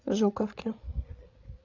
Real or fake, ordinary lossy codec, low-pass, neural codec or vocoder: fake; AAC, 48 kbps; 7.2 kHz; codec, 16 kHz, 16 kbps, FreqCodec, larger model